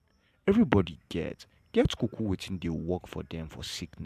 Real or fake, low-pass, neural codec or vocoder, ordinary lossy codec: fake; 14.4 kHz; vocoder, 44.1 kHz, 128 mel bands every 512 samples, BigVGAN v2; MP3, 96 kbps